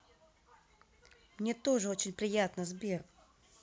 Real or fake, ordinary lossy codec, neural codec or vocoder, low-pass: real; none; none; none